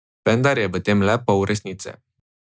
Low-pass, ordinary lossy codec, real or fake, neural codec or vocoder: none; none; real; none